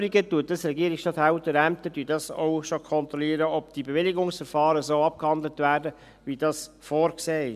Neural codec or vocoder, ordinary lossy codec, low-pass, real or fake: none; none; 14.4 kHz; real